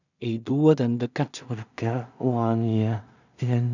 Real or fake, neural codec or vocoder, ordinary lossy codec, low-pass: fake; codec, 16 kHz in and 24 kHz out, 0.4 kbps, LongCat-Audio-Codec, two codebook decoder; none; 7.2 kHz